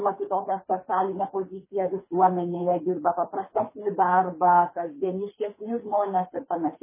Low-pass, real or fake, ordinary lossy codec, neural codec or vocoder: 3.6 kHz; fake; MP3, 16 kbps; codec, 24 kHz, 3 kbps, HILCodec